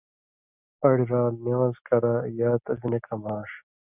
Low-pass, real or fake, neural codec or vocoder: 3.6 kHz; real; none